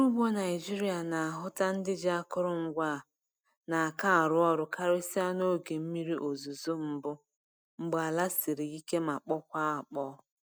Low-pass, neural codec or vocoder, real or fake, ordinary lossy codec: none; none; real; none